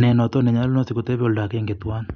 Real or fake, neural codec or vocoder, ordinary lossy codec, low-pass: real; none; none; 7.2 kHz